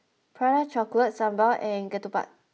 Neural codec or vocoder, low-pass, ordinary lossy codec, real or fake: none; none; none; real